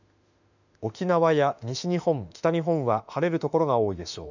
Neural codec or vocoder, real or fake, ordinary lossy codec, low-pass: autoencoder, 48 kHz, 32 numbers a frame, DAC-VAE, trained on Japanese speech; fake; none; 7.2 kHz